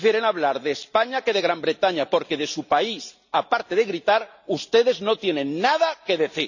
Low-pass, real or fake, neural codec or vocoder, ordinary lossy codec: 7.2 kHz; real; none; none